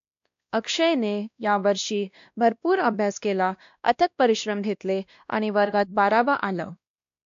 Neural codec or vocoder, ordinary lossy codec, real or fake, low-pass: codec, 16 kHz, 1 kbps, X-Codec, WavLM features, trained on Multilingual LibriSpeech; AAC, 64 kbps; fake; 7.2 kHz